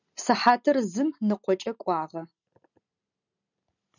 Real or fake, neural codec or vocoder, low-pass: real; none; 7.2 kHz